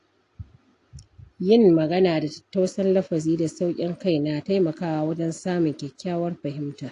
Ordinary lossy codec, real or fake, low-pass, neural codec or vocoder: AAC, 48 kbps; real; 10.8 kHz; none